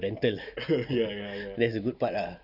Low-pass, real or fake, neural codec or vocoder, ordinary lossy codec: 5.4 kHz; real; none; none